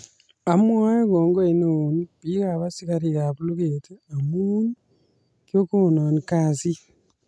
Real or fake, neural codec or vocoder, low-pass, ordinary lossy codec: real; none; none; none